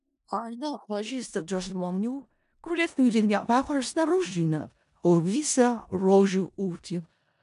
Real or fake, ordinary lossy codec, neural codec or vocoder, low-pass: fake; AAC, 96 kbps; codec, 16 kHz in and 24 kHz out, 0.4 kbps, LongCat-Audio-Codec, four codebook decoder; 10.8 kHz